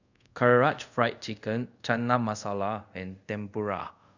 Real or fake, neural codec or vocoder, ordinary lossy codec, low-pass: fake; codec, 24 kHz, 0.5 kbps, DualCodec; none; 7.2 kHz